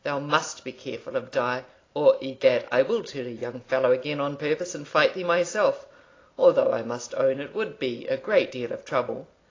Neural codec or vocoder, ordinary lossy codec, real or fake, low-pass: none; AAC, 32 kbps; real; 7.2 kHz